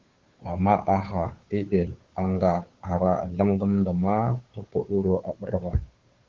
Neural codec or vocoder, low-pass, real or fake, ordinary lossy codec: codec, 16 kHz, 2 kbps, FunCodec, trained on Chinese and English, 25 frames a second; 7.2 kHz; fake; Opus, 16 kbps